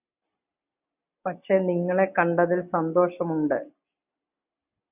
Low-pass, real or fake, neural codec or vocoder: 3.6 kHz; real; none